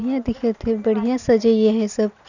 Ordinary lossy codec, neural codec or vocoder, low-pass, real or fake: none; none; 7.2 kHz; real